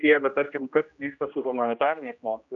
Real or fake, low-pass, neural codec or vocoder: fake; 7.2 kHz; codec, 16 kHz, 1 kbps, X-Codec, HuBERT features, trained on general audio